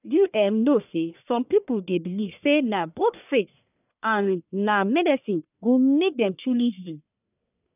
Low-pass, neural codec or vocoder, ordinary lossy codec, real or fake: 3.6 kHz; codec, 44.1 kHz, 1.7 kbps, Pupu-Codec; none; fake